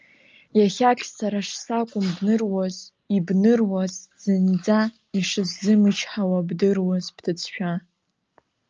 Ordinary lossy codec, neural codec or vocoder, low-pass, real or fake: Opus, 24 kbps; none; 7.2 kHz; real